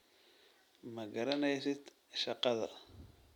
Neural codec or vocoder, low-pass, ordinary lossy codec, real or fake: none; 19.8 kHz; none; real